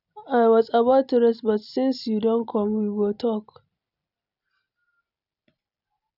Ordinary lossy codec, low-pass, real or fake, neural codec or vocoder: none; 5.4 kHz; real; none